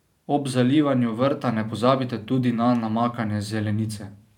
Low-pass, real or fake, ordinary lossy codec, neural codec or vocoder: 19.8 kHz; fake; none; vocoder, 44.1 kHz, 128 mel bands every 512 samples, BigVGAN v2